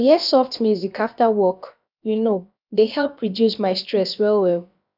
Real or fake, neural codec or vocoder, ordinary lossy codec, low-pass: fake; codec, 16 kHz, about 1 kbps, DyCAST, with the encoder's durations; none; 5.4 kHz